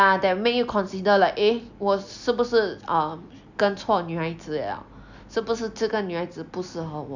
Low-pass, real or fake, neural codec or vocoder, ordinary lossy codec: 7.2 kHz; real; none; none